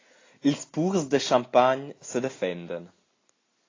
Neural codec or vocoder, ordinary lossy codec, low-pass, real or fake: none; AAC, 32 kbps; 7.2 kHz; real